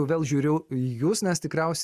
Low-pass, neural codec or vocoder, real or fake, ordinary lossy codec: 14.4 kHz; none; real; Opus, 64 kbps